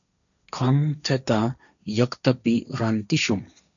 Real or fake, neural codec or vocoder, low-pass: fake; codec, 16 kHz, 1.1 kbps, Voila-Tokenizer; 7.2 kHz